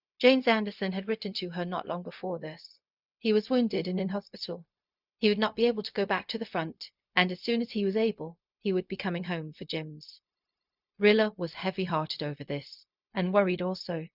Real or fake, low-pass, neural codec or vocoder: fake; 5.4 kHz; codec, 16 kHz, 0.4 kbps, LongCat-Audio-Codec